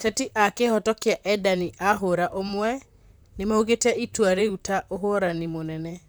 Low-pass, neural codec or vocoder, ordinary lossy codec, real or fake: none; vocoder, 44.1 kHz, 128 mel bands, Pupu-Vocoder; none; fake